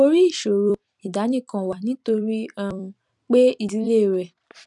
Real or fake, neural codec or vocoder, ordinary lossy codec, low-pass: fake; vocoder, 44.1 kHz, 128 mel bands every 256 samples, BigVGAN v2; none; 10.8 kHz